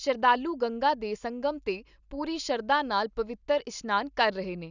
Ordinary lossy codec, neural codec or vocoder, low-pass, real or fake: none; none; 7.2 kHz; real